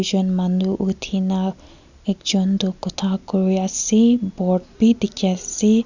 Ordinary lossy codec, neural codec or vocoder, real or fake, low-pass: none; none; real; 7.2 kHz